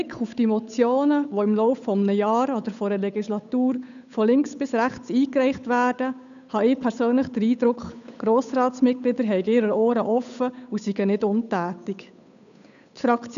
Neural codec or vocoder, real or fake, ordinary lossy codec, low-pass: codec, 16 kHz, 8 kbps, FunCodec, trained on Chinese and English, 25 frames a second; fake; none; 7.2 kHz